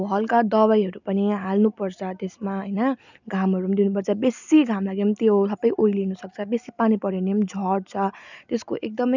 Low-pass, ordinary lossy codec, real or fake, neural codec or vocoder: 7.2 kHz; none; real; none